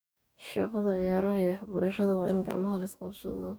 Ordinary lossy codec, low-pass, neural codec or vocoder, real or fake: none; none; codec, 44.1 kHz, 2.6 kbps, DAC; fake